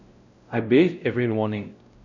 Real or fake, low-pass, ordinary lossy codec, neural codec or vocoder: fake; 7.2 kHz; none; codec, 16 kHz, 0.5 kbps, X-Codec, WavLM features, trained on Multilingual LibriSpeech